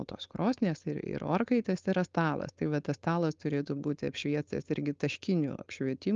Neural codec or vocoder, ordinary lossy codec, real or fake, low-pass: codec, 16 kHz, 4.8 kbps, FACodec; Opus, 24 kbps; fake; 7.2 kHz